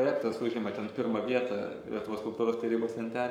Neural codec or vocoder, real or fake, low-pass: codec, 44.1 kHz, 7.8 kbps, Pupu-Codec; fake; 19.8 kHz